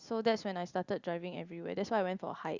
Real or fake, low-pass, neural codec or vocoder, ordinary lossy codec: real; 7.2 kHz; none; Opus, 64 kbps